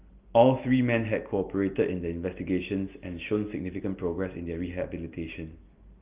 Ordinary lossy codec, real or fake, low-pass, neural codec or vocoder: Opus, 32 kbps; real; 3.6 kHz; none